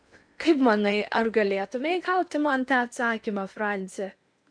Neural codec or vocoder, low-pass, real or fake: codec, 16 kHz in and 24 kHz out, 0.8 kbps, FocalCodec, streaming, 65536 codes; 9.9 kHz; fake